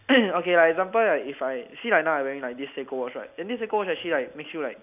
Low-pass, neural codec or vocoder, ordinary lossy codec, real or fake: 3.6 kHz; none; none; real